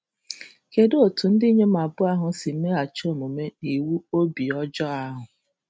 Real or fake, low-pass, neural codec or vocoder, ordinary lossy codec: real; none; none; none